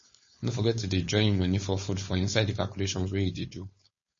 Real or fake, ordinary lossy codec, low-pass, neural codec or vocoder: fake; MP3, 32 kbps; 7.2 kHz; codec, 16 kHz, 4.8 kbps, FACodec